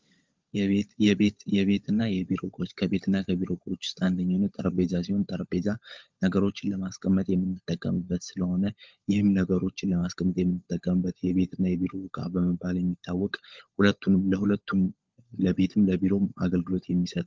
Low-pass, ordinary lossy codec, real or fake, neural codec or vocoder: 7.2 kHz; Opus, 32 kbps; fake; codec, 16 kHz, 16 kbps, FunCodec, trained on LibriTTS, 50 frames a second